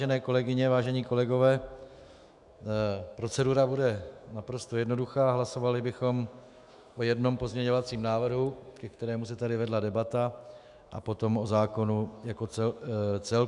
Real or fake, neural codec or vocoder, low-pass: fake; autoencoder, 48 kHz, 128 numbers a frame, DAC-VAE, trained on Japanese speech; 10.8 kHz